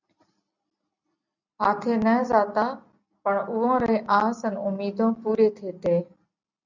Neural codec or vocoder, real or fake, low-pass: none; real; 7.2 kHz